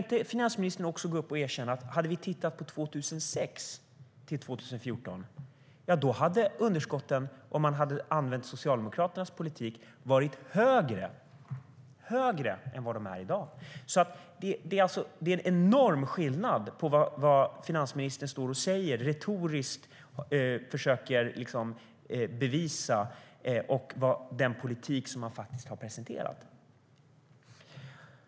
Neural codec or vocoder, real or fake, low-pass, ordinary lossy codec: none; real; none; none